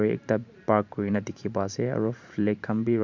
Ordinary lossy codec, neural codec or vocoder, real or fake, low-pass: none; vocoder, 44.1 kHz, 128 mel bands every 512 samples, BigVGAN v2; fake; 7.2 kHz